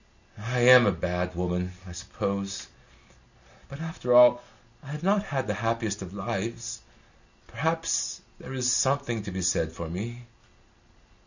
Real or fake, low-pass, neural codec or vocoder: real; 7.2 kHz; none